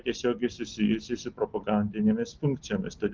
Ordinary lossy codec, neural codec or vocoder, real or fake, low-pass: Opus, 24 kbps; none; real; 7.2 kHz